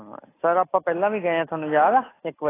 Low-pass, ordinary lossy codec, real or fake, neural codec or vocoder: 3.6 kHz; AAC, 16 kbps; real; none